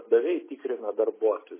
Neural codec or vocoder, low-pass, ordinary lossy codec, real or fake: none; 3.6 kHz; MP3, 16 kbps; real